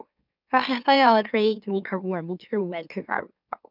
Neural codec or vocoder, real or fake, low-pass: autoencoder, 44.1 kHz, a latent of 192 numbers a frame, MeloTTS; fake; 5.4 kHz